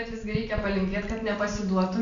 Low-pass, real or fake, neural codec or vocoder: 7.2 kHz; real; none